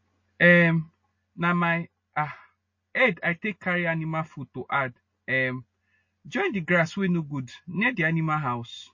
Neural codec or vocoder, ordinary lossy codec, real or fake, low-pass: none; MP3, 32 kbps; real; 7.2 kHz